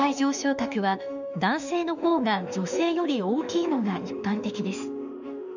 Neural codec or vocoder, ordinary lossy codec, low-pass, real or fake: autoencoder, 48 kHz, 32 numbers a frame, DAC-VAE, trained on Japanese speech; none; 7.2 kHz; fake